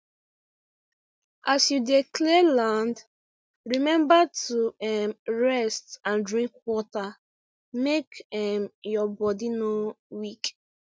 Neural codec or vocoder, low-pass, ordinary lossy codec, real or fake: none; none; none; real